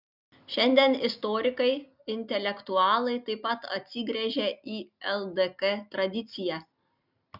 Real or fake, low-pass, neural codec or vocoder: real; 5.4 kHz; none